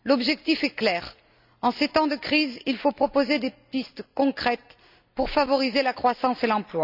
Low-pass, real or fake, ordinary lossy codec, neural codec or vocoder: 5.4 kHz; real; none; none